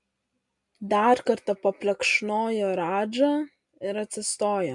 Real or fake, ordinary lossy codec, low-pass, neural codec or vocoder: real; MP3, 96 kbps; 10.8 kHz; none